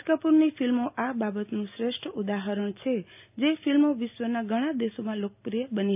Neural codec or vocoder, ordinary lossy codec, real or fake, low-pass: none; AAC, 32 kbps; real; 3.6 kHz